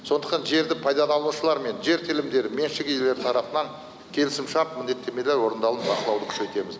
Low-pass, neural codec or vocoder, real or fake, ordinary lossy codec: none; none; real; none